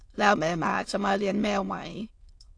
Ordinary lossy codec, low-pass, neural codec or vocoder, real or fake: AAC, 48 kbps; 9.9 kHz; autoencoder, 22.05 kHz, a latent of 192 numbers a frame, VITS, trained on many speakers; fake